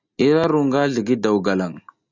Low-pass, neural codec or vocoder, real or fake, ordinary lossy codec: 7.2 kHz; none; real; Opus, 64 kbps